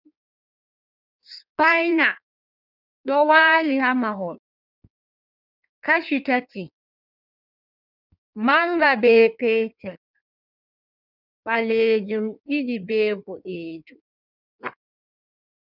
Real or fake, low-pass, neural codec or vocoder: fake; 5.4 kHz; codec, 16 kHz in and 24 kHz out, 1.1 kbps, FireRedTTS-2 codec